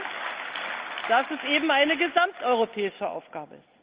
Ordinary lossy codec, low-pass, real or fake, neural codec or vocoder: Opus, 32 kbps; 3.6 kHz; real; none